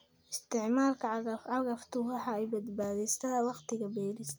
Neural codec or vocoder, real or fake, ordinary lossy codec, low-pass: none; real; none; none